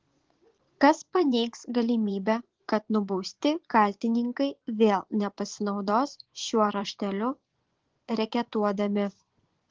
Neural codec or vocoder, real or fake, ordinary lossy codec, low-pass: vocoder, 24 kHz, 100 mel bands, Vocos; fake; Opus, 16 kbps; 7.2 kHz